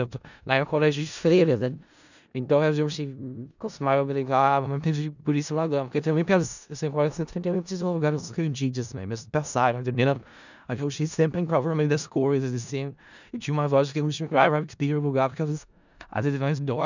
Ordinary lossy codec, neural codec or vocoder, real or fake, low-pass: none; codec, 16 kHz in and 24 kHz out, 0.4 kbps, LongCat-Audio-Codec, four codebook decoder; fake; 7.2 kHz